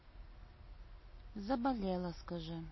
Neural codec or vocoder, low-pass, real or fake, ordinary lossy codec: none; 7.2 kHz; real; MP3, 24 kbps